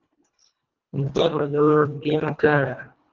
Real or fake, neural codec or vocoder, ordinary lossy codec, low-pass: fake; codec, 24 kHz, 1.5 kbps, HILCodec; Opus, 32 kbps; 7.2 kHz